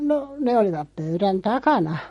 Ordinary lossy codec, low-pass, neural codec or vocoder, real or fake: MP3, 48 kbps; 19.8 kHz; none; real